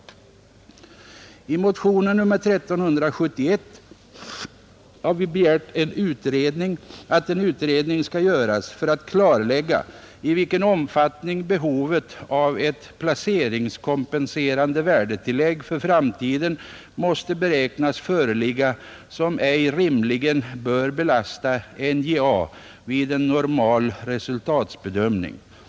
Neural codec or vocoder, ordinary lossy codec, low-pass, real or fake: none; none; none; real